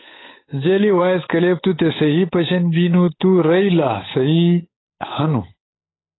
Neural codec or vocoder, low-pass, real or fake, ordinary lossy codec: codec, 16 kHz, 8 kbps, FunCodec, trained on Chinese and English, 25 frames a second; 7.2 kHz; fake; AAC, 16 kbps